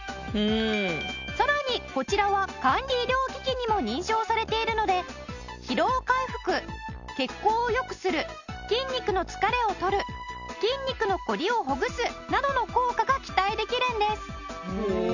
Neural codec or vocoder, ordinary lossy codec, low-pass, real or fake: none; none; 7.2 kHz; real